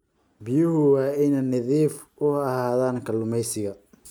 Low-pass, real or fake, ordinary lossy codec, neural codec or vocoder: none; real; none; none